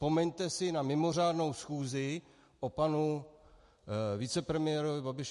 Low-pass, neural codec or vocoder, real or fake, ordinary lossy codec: 14.4 kHz; none; real; MP3, 48 kbps